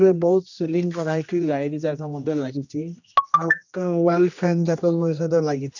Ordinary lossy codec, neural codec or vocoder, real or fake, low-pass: none; codec, 16 kHz, 1 kbps, X-Codec, HuBERT features, trained on general audio; fake; 7.2 kHz